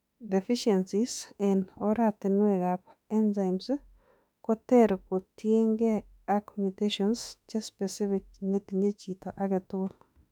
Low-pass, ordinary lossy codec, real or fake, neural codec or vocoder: 19.8 kHz; none; fake; autoencoder, 48 kHz, 32 numbers a frame, DAC-VAE, trained on Japanese speech